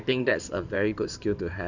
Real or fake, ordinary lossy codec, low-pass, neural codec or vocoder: fake; none; 7.2 kHz; codec, 16 kHz in and 24 kHz out, 2.2 kbps, FireRedTTS-2 codec